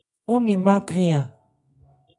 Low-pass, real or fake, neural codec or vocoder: 10.8 kHz; fake; codec, 24 kHz, 0.9 kbps, WavTokenizer, medium music audio release